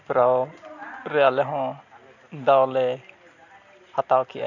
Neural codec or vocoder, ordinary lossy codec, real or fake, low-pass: none; none; real; 7.2 kHz